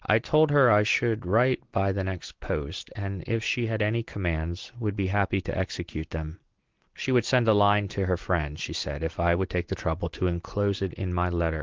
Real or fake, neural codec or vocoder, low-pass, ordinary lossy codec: real; none; 7.2 kHz; Opus, 16 kbps